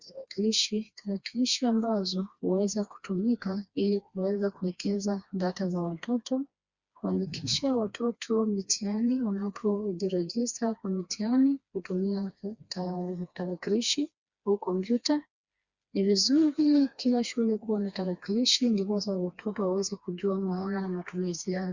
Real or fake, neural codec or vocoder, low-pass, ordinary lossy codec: fake; codec, 16 kHz, 2 kbps, FreqCodec, smaller model; 7.2 kHz; Opus, 64 kbps